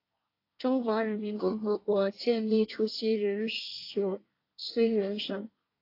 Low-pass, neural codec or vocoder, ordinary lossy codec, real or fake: 5.4 kHz; codec, 24 kHz, 1 kbps, SNAC; AAC, 32 kbps; fake